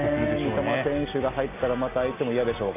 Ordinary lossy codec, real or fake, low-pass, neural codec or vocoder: AAC, 24 kbps; real; 3.6 kHz; none